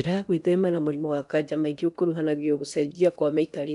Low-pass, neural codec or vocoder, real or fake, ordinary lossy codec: 10.8 kHz; codec, 16 kHz in and 24 kHz out, 0.8 kbps, FocalCodec, streaming, 65536 codes; fake; none